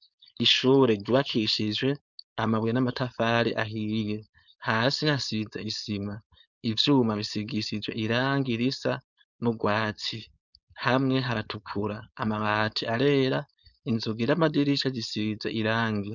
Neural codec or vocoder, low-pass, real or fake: codec, 16 kHz, 4.8 kbps, FACodec; 7.2 kHz; fake